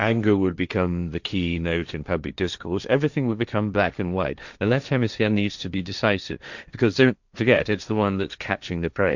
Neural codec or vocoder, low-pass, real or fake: codec, 16 kHz, 1.1 kbps, Voila-Tokenizer; 7.2 kHz; fake